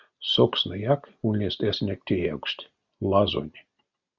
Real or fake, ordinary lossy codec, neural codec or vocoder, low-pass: real; Opus, 64 kbps; none; 7.2 kHz